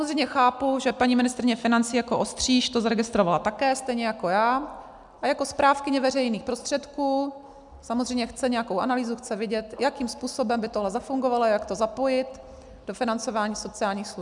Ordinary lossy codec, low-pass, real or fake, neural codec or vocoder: MP3, 96 kbps; 10.8 kHz; real; none